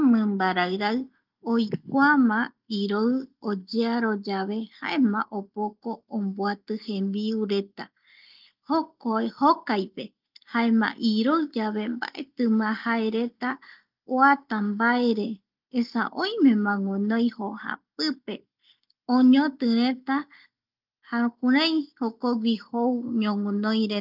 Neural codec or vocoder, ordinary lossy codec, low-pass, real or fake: none; Opus, 24 kbps; 5.4 kHz; real